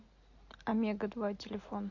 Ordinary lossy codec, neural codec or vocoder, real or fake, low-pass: MP3, 48 kbps; none; real; 7.2 kHz